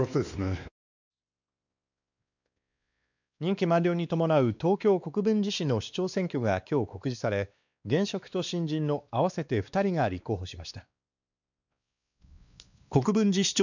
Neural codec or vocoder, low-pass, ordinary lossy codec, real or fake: codec, 16 kHz, 2 kbps, X-Codec, WavLM features, trained on Multilingual LibriSpeech; 7.2 kHz; none; fake